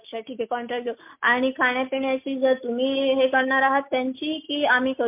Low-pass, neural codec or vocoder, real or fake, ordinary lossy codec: 3.6 kHz; none; real; MP3, 32 kbps